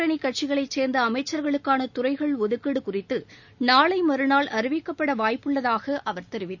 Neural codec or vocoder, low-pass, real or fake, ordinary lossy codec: none; 7.2 kHz; real; none